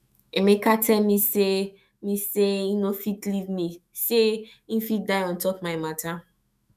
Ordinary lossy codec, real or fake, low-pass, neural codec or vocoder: none; fake; 14.4 kHz; autoencoder, 48 kHz, 128 numbers a frame, DAC-VAE, trained on Japanese speech